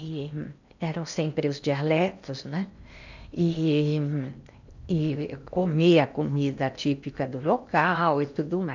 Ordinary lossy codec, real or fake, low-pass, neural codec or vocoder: none; fake; 7.2 kHz; codec, 16 kHz in and 24 kHz out, 0.8 kbps, FocalCodec, streaming, 65536 codes